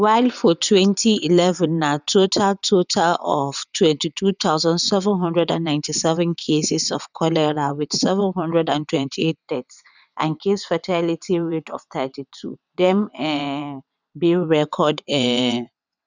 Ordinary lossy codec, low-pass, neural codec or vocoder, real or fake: none; 7.2 kHz; vocoder, 22.05 kHz, 80 mel bands, WaveNeXt; fake